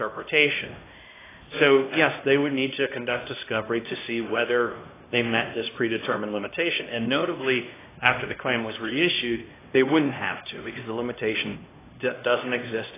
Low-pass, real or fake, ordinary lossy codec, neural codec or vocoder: 3.6 kHz; fake; AAC, 16 kbps; codec, 16 kHz, 1 kbps, X-Codec, HuBERT features, trained on LibriSpeech